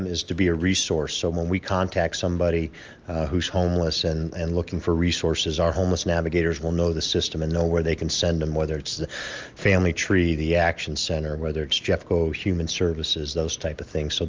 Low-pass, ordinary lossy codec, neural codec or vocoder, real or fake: 7.2 kHz; Opus, 24 kbps; none; real